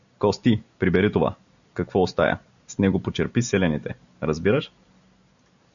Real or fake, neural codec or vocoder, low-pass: real; none; 7.2 kHz